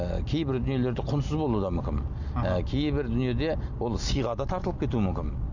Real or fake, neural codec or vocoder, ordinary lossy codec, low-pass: real; none; none; 7.2 kHz